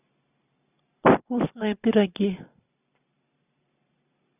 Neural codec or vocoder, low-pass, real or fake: none; 3.6 kHz; real